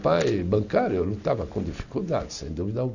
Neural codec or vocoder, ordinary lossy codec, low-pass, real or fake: none; none; 7.2 kHz; real